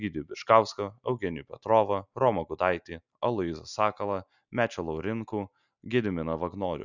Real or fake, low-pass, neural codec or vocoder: real; 7.2 kHz; none